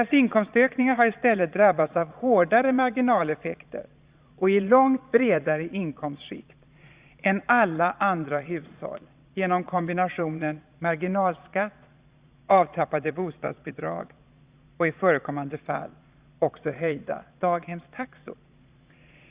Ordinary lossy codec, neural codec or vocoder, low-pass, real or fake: Opus, 64 kbps; vocoder, 22.05 kHz, 80 mel bands, Vocos; 3.6 kHz; fake